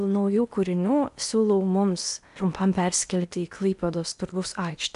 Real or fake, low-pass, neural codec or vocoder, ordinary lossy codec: fake; 10.8 kHz; codec, 16 kHz in and 24 kHz out, 0.8 kbps, FocalCodec, streaming, 65536 codes; MP3, 96 kbps